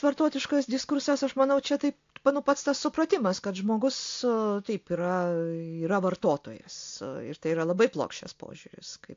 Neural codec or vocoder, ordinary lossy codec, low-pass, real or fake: none; AAC, 48 kbps; 7.2 kHz; real